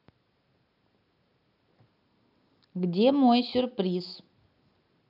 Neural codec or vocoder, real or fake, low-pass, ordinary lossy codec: none; real; 5.4 kHz; none